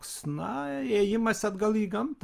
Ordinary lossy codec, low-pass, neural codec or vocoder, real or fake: Opus, 32 kbps; 14.4 kHz; none; real